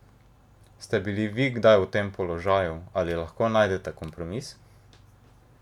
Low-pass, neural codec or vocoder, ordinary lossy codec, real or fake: 19.8 kHz; none; none; real